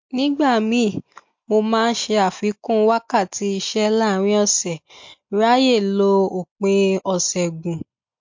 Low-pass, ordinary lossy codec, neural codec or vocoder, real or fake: 7.2 kHz; MP3, 48 kbps; none; real